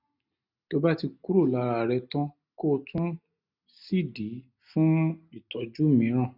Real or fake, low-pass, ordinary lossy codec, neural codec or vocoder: real; 5.4 kHz; none; none